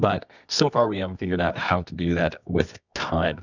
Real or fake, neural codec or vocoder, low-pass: fake; codec, 24 kHz, 0.9 kbps, WavTokenizer, medium music audio release; 7.2 kHz